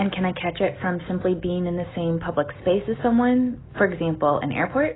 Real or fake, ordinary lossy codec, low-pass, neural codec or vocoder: real; AAC, 16 kbps; 7.2 kHz; none